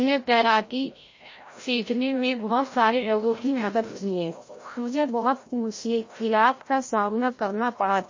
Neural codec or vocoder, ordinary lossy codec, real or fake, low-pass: codec, 16 kHz, 0.5 kbps, FreqCodec, larger model; MP3, 32 kbps; fake; 7.2 kHz